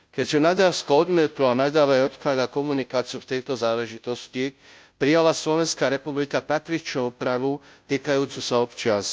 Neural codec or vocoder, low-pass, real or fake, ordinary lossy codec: codec, 16 kHz, 0.5 kbps, FunCodec, trained on Chinese and English, 25 frames a second; none; fake; none